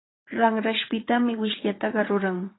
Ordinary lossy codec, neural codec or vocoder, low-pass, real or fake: AAC, 16 kbps; vocoder, 22.05 kHz, 80 mel bands, WaveNeXt; 7.2 kHz; fake